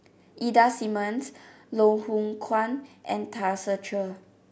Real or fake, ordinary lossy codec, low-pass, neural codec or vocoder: real; none; none; none